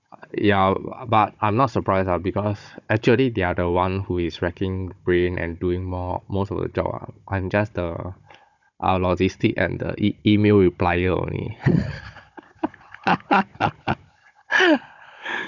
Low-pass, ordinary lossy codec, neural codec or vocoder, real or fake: 7.2 kHz; none; codec, 16 kHz, 16 kbps, FunCodec, trained on Chinese and English, 50 frames a second; fake